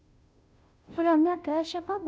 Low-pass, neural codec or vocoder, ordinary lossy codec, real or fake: none; codec, 16 kHz, 0.5 kbps, FunCodec, trained on Chinese and English, 25 frames a second; none; fake